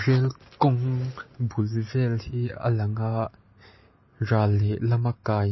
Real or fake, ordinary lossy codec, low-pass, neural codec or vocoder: real; MP3, 24 kbps; 7.2 kHz; none